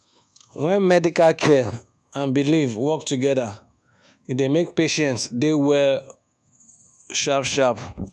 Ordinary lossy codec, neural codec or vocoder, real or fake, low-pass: none; codec, 24 kHz, 1.2 kbps, DualCodec; fake; none